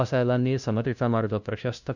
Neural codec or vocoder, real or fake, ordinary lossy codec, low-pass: codec, 16 kHz, 0.5 kbps, FunCodec, trained on LibriTTS, 25 frames a second; fake; none; 7.2 kHz